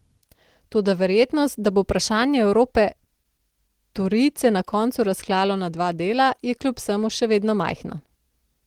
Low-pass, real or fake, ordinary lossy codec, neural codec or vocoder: 19.8 kHz; real; Opus, 16 kbps; none